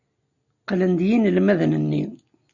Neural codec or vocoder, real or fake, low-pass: none; real; 7.2 kHz